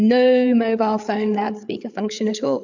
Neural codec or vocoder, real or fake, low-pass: codec, 16 kHz, 8 kbps, FreqCodec, larger model; fake; 7.2 kHz